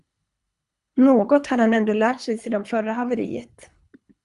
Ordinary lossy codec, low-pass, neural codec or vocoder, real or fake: Opus, 64 kbps; 10.8 kHz; codec, 24 kHz, 3 kbps, HILCodec; fake